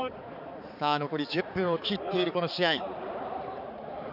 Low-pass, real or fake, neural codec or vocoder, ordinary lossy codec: 5.4 kHz; fake; codec, 16 kHz, 4 kbps, X-Codec, HuBERT features, trained on balanced general audio; none